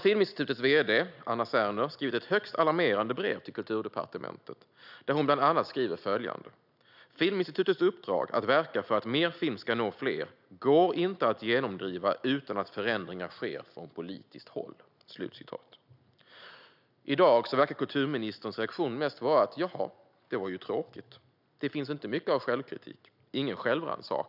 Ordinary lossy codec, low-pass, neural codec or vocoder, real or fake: none; 5.4 kHz; none; real